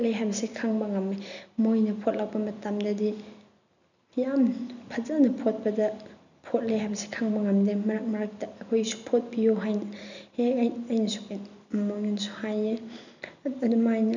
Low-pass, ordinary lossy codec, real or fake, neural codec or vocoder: 7.2 kHz; none; real; none